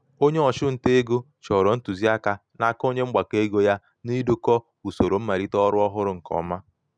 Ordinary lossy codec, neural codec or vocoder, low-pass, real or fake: none; none; 9.9 kHz; real